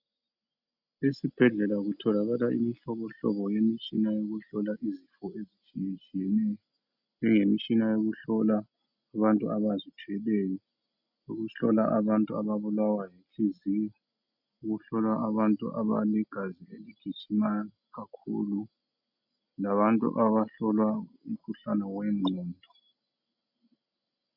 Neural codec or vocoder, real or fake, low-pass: none; real; 5.4 kHz